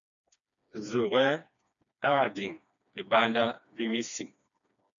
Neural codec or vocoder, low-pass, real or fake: codec, 16 kHz, 2 kbps, FreqCodec, smaller model; 7.2 kHz; fake